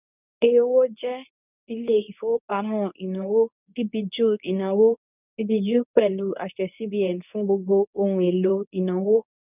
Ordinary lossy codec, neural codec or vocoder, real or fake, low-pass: none; codec, 24 kHz, 0.9 kbps, WavTokenizer, medium speech release version 2; fake; 3.6 kHz